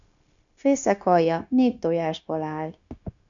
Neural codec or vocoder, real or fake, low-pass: codec, 16 kHz, 0.9 kbps, LongCat-Audio-Codec; fake; 7.2 kHz